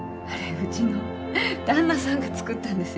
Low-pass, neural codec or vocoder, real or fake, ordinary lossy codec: none; none; real; none